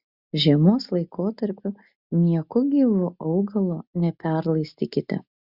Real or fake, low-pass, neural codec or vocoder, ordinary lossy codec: real; 5.4 kHz; none; Opus, 64 kbps